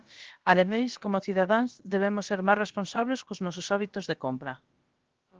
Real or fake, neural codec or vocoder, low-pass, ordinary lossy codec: fake; codec, 16 kHz, about 1 kbps, DyCAST, with the encoder's durations; 7.2 kHz; Opus, 16 kbps